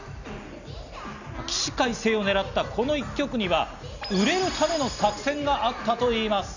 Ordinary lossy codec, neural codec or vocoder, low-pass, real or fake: none; none; 7.2 kHz; real